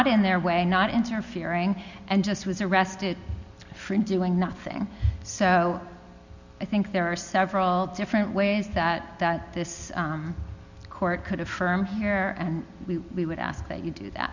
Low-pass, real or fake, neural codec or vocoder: 7.2 kHz; real; none